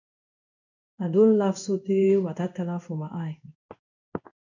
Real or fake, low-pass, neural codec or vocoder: fake; 7.2 kHz; codec, 16 kHz in and 24 kHz out, 1 kbps, XY-Tokenizer